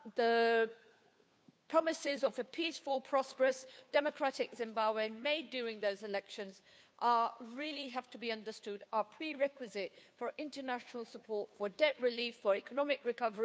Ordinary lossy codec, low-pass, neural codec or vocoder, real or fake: none; none; codec, 16 kHz, 2 kbps, FunCodec, trained on Chinese and English, 25 frames a second; fake